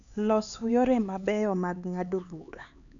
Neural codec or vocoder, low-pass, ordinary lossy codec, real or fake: codec, 16 kHz, 4 kbps, X-Codec, HuBERT features, trained on LibriSpeech; 7.2 kHz; none; fake